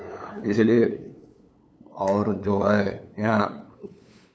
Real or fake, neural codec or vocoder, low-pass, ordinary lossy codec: fake; codec, 16 kHz, 8 kbps, FunCodec, trained on LibriTTS, 25 frames a second; none; none